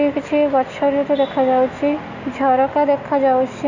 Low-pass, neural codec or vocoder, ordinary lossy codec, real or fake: 7.2 kHz; none; Opus, 64 kbps; real